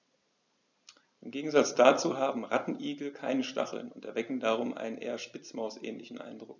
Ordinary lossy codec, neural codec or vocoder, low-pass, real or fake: none; none; 7.2 kHz; real